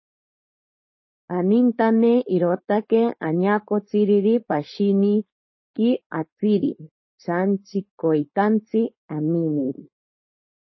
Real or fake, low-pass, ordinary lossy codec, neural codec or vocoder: fake; 7.2 kHz; MP3, 24 kbps; codec, 16 kHz, 4.8 kbps, FACodec